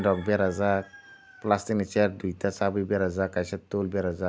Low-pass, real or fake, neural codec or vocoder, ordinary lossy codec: none; real; none; none